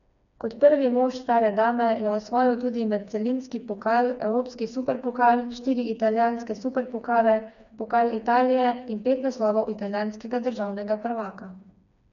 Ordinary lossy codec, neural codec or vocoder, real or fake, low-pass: none; codec, 16 kHz, 2 kbps, FreqCodec, smaller model; fake; 7.2 kHz